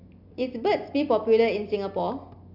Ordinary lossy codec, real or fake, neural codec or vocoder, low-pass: none; real; none; 5.4 kHz